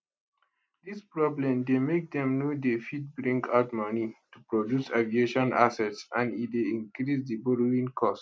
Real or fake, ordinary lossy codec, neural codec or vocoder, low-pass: real; none; none; none